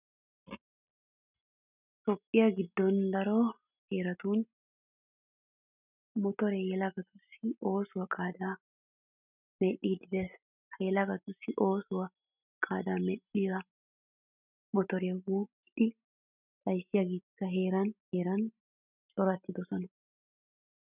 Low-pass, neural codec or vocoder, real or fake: 3.6 kHz; none; real